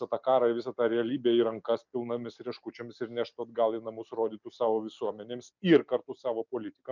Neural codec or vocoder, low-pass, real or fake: none; 7.2 kHz; real